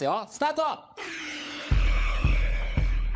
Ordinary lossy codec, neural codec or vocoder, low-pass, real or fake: none; codec, 16 kHz, 16 kbps, FunCodec, trained on LibriTTS, 50 frames a second; none; fake